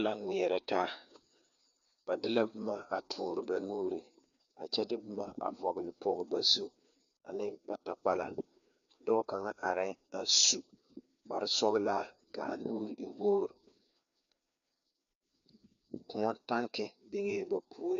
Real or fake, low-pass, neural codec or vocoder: fake; 7.2 kHz; codec, 16 kHz, 2 kbps, FreqCodec, larger model